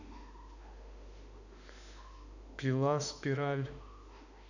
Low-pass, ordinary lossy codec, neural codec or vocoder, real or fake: 7.2 kHz; none; autoencoder, 48 kHz, 32 numbers a frame, DAC-VAE, trained on Japanese speech; fake